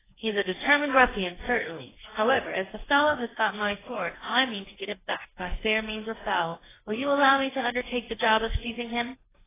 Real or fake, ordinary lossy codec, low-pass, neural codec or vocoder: fake; AAC, 16 kbps; 3.6 kHz; codec, 44.1 kHz, 2.6 kbps, DAC